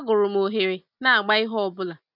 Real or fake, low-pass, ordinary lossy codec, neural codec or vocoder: real; 5.4 kHz; none; none